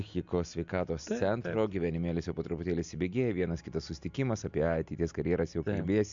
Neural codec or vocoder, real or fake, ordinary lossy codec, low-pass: none; real; MP3, 64 kbps; 7.2 kHz